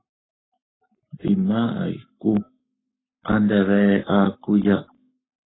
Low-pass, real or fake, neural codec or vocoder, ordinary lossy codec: 7.2 kHz; fake; codec, 44.1 kHz, 7.8 kbps, Pupu-Codec; AAC, 16 kbps